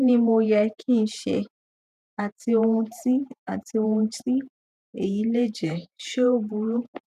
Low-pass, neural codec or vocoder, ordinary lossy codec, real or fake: 14.4 kHz; vocoder, 48 kHz, 128 mel bands, Vocos; none; fake